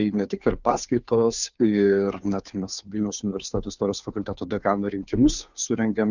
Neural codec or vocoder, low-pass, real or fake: codec, 16 kHz, 2 kbps, FunCodec, trained on Chinese and English, 25 frames a second; 7.2 kHz; fake